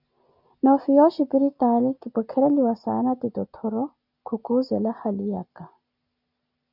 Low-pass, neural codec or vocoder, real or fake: 5.4 kHz; none; real